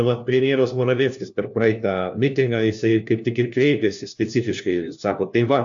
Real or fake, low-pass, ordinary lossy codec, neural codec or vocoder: fake; 7.2 kHz; AAC, 64 kbps; codec, 16 kHz, 1 kbps, FunCodec, trained on LibriTTS, 50 frames a second